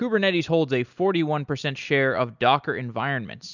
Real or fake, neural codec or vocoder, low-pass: real; none; 7.2 kHz